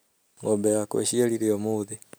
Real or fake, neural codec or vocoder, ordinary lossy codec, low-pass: real; none; none; none